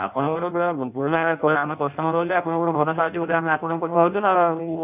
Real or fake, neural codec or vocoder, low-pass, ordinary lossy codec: fake; codec, 16 kHz in and 24 kHz out, 0.6 kbps, FireRedTTS-2 codec; 3.6 kHz; none